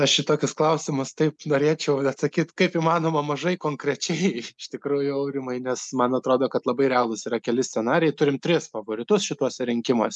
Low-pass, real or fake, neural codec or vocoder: 10.8 kHz; real; none